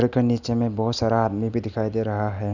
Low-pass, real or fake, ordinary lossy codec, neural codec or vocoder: 7.2 kHz; real; none; none